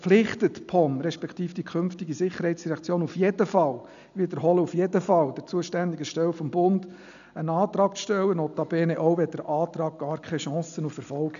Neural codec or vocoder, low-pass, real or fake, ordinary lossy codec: none; 7.2 kHz; real; none